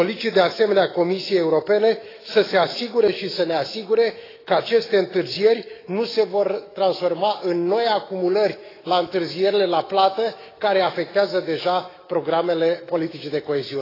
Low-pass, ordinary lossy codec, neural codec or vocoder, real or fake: 5.4 kHz; AAC, 24 kbps; autoencoder, 48 kHz, 128 numbers a frame, DAC-VAE, trained on Japanese speech; fake